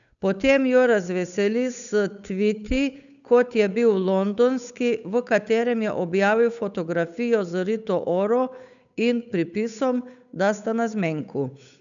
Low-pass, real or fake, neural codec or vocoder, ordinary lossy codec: 7.2 kHz; fake; codec, 16 kHz, 8 kbps, FunCodec, trained on Chinese and English, 25 frames a second; none